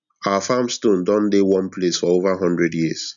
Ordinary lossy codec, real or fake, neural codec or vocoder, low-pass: none; real; none; 7.2 kHz